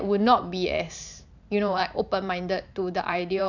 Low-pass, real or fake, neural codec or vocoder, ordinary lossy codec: 7.2 kHz; fake; vocoder, 44.1 kHz, 128 mel bands every 512 samples, BigVGAN v2; none